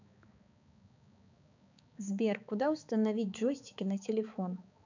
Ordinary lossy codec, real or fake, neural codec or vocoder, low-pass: none; fake; codec, 16 kHz, 4 kbps, X-Codec, HuBERT features, trained on balanced general audio; 7.2 kHz